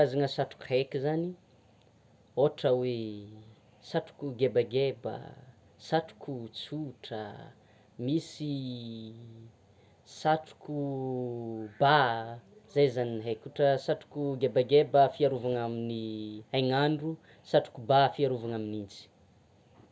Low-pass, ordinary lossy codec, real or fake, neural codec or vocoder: none; none; real; none